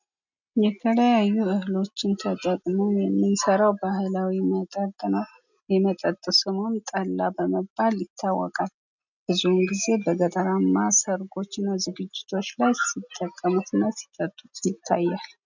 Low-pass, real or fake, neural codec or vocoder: 7.2 kHz; real; none